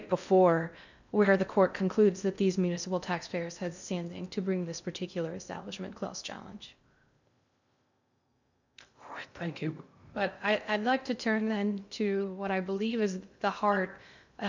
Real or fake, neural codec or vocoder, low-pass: fake; codec, 16 kHz in and 24 kHz out, 0.6 kbps, FocalCodec, streaming, 2048 codes; 7.2 kHz